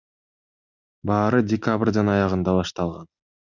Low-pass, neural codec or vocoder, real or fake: 7.2 kHz; none; real